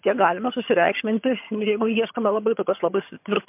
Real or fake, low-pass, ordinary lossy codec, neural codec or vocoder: fake; 3.6 kHz; MP3, 32 kbps; vocoder, 22.05 kHz, 80 mel bands, HiFi-GAN